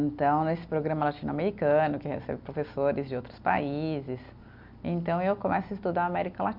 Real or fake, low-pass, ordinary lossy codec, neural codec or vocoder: real; 5.4 kHz; MP3, 48 kbps; none